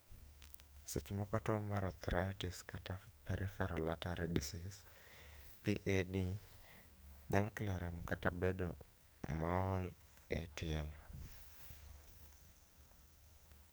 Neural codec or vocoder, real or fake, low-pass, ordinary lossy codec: codec, 44.1 kHz, 2.6 kbps, SNAC; fake; none; none